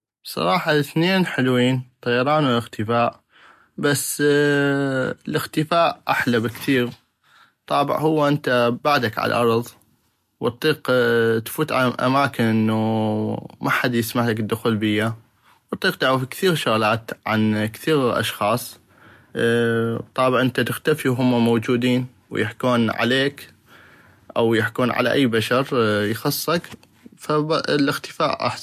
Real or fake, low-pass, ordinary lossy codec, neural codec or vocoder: real; 14.4 kHz; MP3, 64 kbps; none